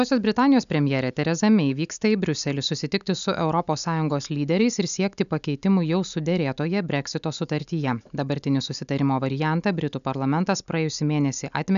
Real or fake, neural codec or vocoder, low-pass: real; none; 7.2 kHz